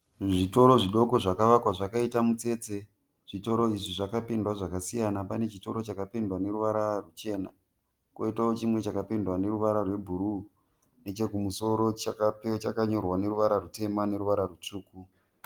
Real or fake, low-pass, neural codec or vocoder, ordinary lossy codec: real; 19.8 kHz; none; Opus, 24 kbps